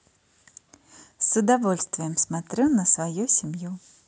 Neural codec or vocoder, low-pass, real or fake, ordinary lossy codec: none; none; real; none